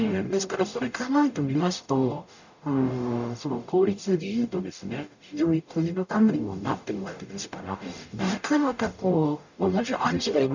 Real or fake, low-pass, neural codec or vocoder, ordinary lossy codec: fake; 7.2 kHz; codec, 44.1 kHz, 0.9 kbps, DAC; none